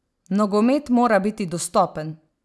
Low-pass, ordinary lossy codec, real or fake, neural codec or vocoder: none; none; real; none